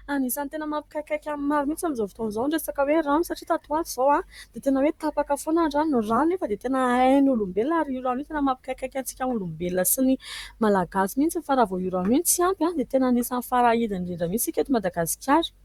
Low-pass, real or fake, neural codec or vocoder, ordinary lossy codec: 19.8 kHz; fake; vocoder, 44.1 kHz, 128 mel bands, Pupu-Vocoder; Opus, 64 kbps